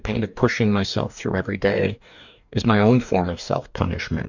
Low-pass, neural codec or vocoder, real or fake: 7.2 kHz; codec, 44.1 kHz, 2.6 kbps, DAC; fake